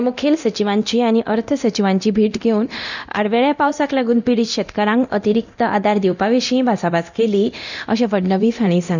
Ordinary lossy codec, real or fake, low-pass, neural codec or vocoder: none; fake; 7.2 kHz; codec, 24 kHz, 0.9 kbps, DualCodec